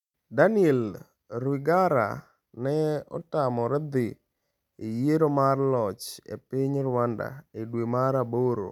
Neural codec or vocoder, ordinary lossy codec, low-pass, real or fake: none; none; 19.8 kHz; real